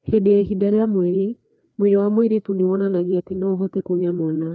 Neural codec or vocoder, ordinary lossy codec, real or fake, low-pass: codec, 16 kHz, 2 kbps, FreqCodec, larger model; none; fake; none